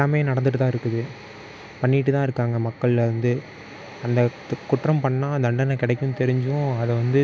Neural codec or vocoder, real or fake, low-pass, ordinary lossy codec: none; real; none; none